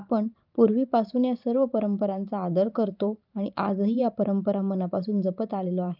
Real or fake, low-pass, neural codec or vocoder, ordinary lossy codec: fake; 5.4 kHz; vocoder, 44.1 kHz, 128 mel bands every 512 samples, BigVGAN v2; Opus, 32 kbps